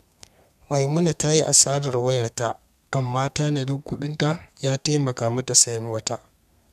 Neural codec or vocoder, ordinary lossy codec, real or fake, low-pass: codec, 32 kHz, 1.9 kbps, SNAC; none; fake; 14.4 kHz